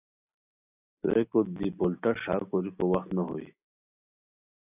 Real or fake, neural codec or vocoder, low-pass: real; none; 3.6 kHz